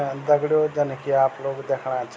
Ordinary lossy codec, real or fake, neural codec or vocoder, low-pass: none; real; none; none